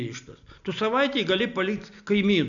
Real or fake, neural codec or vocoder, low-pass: real; none; 7.2 kHz